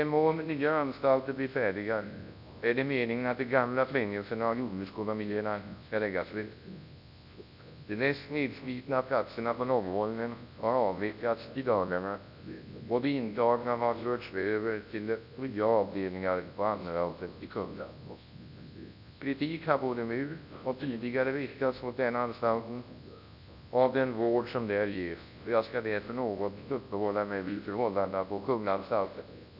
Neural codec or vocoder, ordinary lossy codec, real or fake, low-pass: codec, 24 kHz, 0.9 kbps, WavTokenizer, large speech release; none; fake; 5.4 kHz